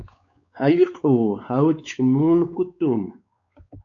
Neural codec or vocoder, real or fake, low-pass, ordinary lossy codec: codec, 16 kHz, 4 kbps, X-Codec, WavLM features, trained on Multilingual LibriSpeech; fake; 7.2 kHz; AAC, 64 kbps